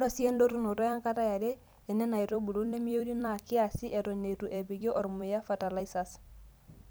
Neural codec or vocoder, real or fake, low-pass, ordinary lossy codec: vocoder, 44.1 kHz, 128 mel bands every 256 samples, BigVGAN v2; fake; none; none